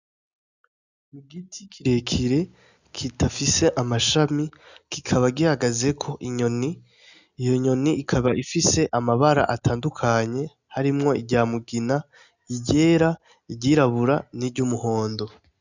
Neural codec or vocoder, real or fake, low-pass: none; real; 7.2 kHz